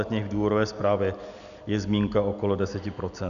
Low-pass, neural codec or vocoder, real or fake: 7.2 kHz; none; real